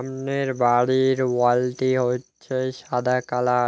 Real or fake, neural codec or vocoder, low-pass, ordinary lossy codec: real; none; none; none